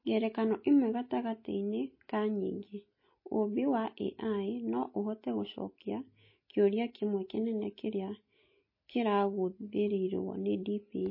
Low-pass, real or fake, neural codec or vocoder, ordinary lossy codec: 5.4 kHz; real; none; MP3, 24 kbps